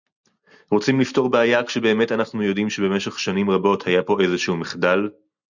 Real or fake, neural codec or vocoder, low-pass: real; none; 7.2 kHz